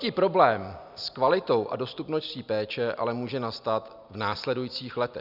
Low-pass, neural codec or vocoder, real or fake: 5.4 kHz; none; real